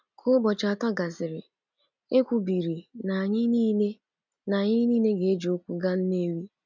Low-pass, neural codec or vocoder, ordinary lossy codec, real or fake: 7.2 kHz; none; none; real